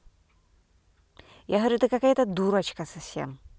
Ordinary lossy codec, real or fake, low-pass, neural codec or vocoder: none; real; none; none